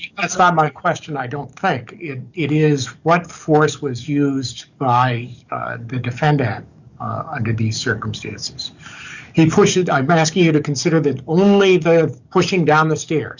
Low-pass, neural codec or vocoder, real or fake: 7.2 kHz; codec, 44.1 kHz, 7.8 kbps, DAC; fake